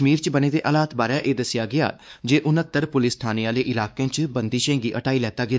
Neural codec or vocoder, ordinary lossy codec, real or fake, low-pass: codec, 16 kHz, 2 kbps, X-Codec, WavLM features, trained on Multilingual LibriSpeech; none; fake; none